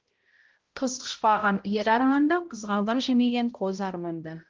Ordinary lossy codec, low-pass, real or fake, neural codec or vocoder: Opus, 16 kbps; 7.2 kHz; fake; codec, 16 kHz, 0.5 kbps, X-Codec, HuBERT features, trained on balanced general audio